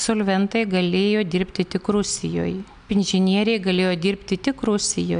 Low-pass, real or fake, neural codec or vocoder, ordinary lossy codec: 9.9 kHz; real; none; AAC, 96 kbps